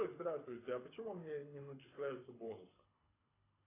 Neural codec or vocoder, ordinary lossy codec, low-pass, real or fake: codec, 24 kHz, 6 kbps, HILCodec; AAC, 16 kbps; 3.6 kHz; fake